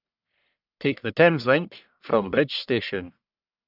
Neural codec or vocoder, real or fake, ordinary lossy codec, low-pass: codec, 44.1 kHz, 1.7 kbps, Pupu-Codec; fake; none; 5.4 kHz